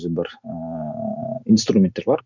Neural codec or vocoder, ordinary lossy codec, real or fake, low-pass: none; none; real; 7.2 kHz